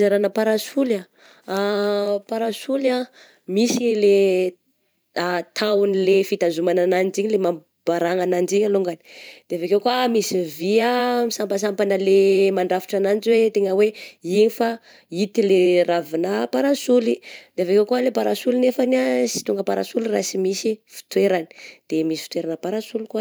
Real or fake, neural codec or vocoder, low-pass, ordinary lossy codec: fake; vocoder, 44.1 kHz, 128 mel bands every 512 samples, BigVGAN v2; none; none